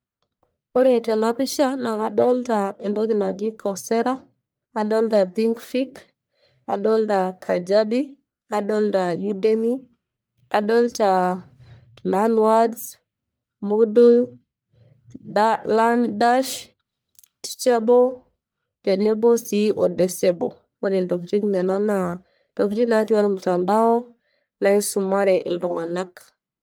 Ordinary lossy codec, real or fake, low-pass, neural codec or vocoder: none; fake; none; codec, 44.1 kHz, 1.7 kbps, Pupu-Codec